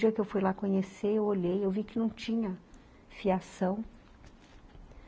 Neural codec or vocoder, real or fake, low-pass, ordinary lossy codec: none; real; none; none